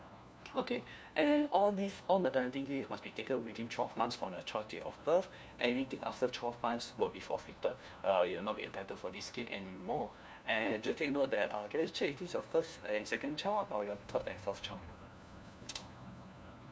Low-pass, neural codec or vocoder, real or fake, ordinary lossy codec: none; codec, 16 kHz, 1 kbps, FunCodec, trained on LibriTTS, 50 frames a second; fake; none